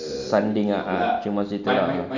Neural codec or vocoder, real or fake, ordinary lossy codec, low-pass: none; real; none; 7.2 kHz